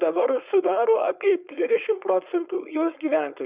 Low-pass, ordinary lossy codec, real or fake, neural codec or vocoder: 3.6 kHz; Opus, 64 kbps; fake; codec, 16 kHz, 4.8 kbps, FACodec